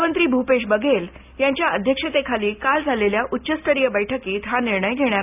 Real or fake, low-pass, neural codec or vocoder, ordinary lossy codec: real; 3.6 kHz; none; none